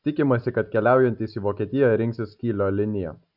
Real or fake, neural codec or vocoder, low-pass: real; none; 5.4 kHz